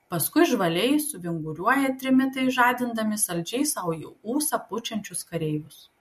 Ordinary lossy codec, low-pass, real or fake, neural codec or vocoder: MP3, 64 kbps; 14.4 kHz; real; none